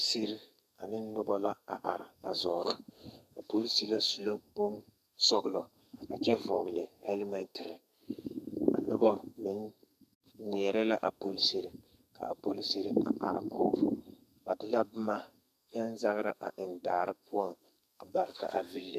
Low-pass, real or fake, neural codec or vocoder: 14.4 kHz; fake; codec, 32 kHz, 1.9 kbps, SNAC